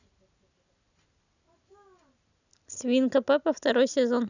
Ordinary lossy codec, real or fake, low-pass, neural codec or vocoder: none; real; 7.2 kHz; none